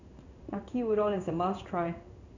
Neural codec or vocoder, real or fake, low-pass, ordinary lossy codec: codec, 16 kHz in and 24 kHz out, 1 kbps, XY-Tokenizer; fake; 7.2 kHz; none